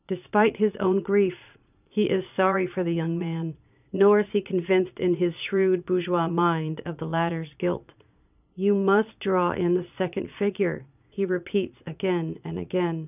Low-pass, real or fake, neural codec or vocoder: 3.6 kHz; fake; vocoder, 22.05 kHz, 80 mel bands, Vocos